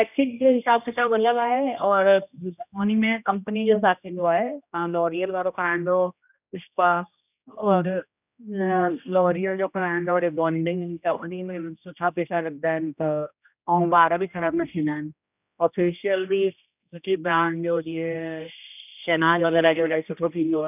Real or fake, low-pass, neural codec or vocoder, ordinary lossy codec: fake; 3.6 kHz; codec, 16 kHz, 1 kbps, X-Codec, HuBERT features, trained on general audio; none